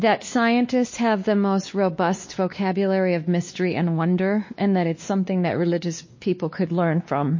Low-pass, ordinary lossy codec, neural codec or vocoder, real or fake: 7.2 kHz; MP3, 32 kbps; codec, 16 kHz, 2 kbps, X-Codec, WavLM features, trained on Multilingual LibriSpeech; fake